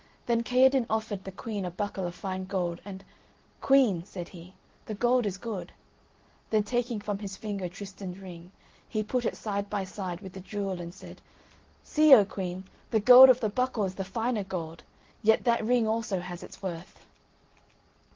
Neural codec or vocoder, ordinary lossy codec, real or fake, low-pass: none; Opus, 16 kbps; real; 7.2 kHz